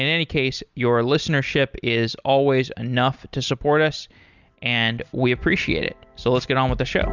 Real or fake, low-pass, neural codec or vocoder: real; 7.2 kHz; none